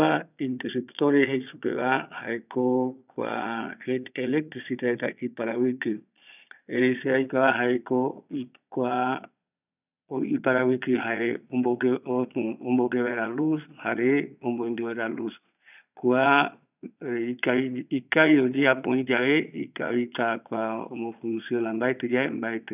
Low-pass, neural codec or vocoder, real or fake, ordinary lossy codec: 3.6 kHz; vocoder, 22.05 kHz, 80 mel bands, Vocos; fake; none